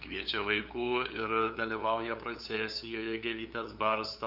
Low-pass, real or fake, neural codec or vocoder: 5.4 kHz; fake; codec, 16 kHz, 8 kbps, FunCodec, trained on LibriTTS, 25 frames a second